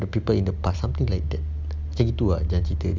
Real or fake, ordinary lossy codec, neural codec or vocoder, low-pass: real; none; none; none